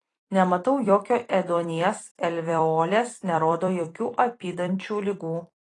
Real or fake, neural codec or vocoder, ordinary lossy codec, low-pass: fake; vocoder, 44.1 kHz, 128 mel bands every 256 samples, BigVGAN v2; AAC, 32 kbps; 10.8 kHz